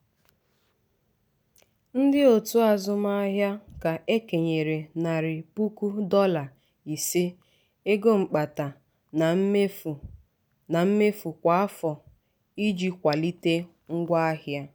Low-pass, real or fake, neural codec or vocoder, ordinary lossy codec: none; real; none; none